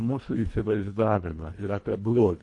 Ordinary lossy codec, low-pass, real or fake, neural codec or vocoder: AAC, 48 kbps; 10.8 kHz; fake; codec, 24 kHz, 1.5 kbps, HILCodec